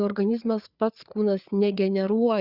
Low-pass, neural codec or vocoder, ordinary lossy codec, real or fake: 5.4 kHz; codec, 16 kHz, 4 kbps, FreqCodec, larger model; Opus, 64 kbps; fake